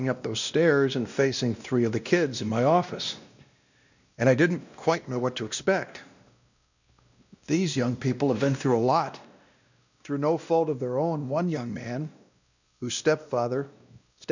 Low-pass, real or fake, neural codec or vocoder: 7.2 kHz; fake; codec, 16 kHz, 1 kbps, X-Codec, WavLM features, trained on Multilingual LibriSpeech